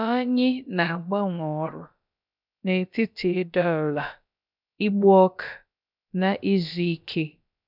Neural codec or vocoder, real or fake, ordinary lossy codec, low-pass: codec, 16 kHz, about 1 kbps, DyCAST, with the encoder's durations; fake; none; 5.4 kHz